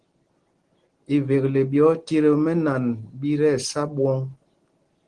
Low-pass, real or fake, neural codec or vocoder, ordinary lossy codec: 9.9 kHz; real; none; Opus, 16 kbps